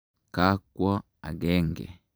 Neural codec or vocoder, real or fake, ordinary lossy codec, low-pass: vocoder, 44.1 kHz, 128 mel bands every 256 samples, BigVGAN v2; fake; none; none